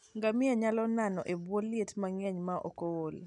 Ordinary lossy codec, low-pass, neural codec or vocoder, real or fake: none; 10.8 kHz; none; real